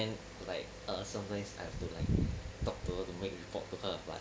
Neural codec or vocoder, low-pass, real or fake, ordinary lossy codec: none; none; real; none